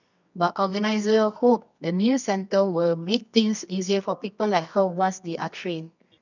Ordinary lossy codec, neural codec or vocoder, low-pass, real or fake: none; codec, 24 kHz, 0.9 kbps, WavTokenizer, medium music audio release; 7.2 kHz; fake